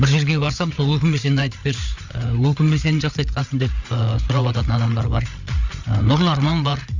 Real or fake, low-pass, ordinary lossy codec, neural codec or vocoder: fake; 7.2 kHz; Opus, 64 kbps; codec, 16 kHz, 8 kbps, FreqCodec, larger model